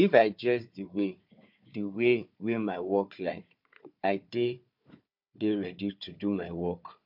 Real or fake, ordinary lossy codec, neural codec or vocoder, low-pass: fake; MP3, 48 kbps; codec, 16 kHz, 4 kbps, FunCodec, trained on Chinese and English, 50 frames a second; 5.4 kHz